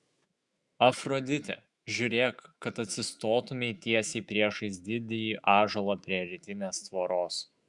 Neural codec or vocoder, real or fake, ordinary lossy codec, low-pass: autoencoder, 48 kHz, 128 numbers a frame, DAC-VAE, trained on Japanese speech; fake; Opus, 64 kbps; 10.8 kHz